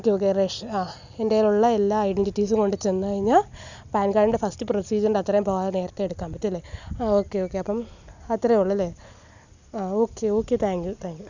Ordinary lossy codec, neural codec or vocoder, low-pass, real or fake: none; none; 7.2 kHz; real